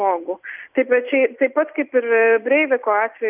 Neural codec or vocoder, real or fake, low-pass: none; real; 3.6 kHz